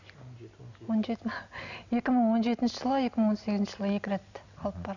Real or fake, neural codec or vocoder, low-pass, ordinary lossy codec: real; none; 7.2 kHz; none